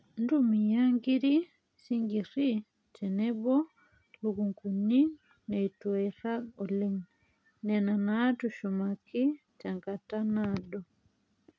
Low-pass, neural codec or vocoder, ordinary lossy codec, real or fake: none; none; none; real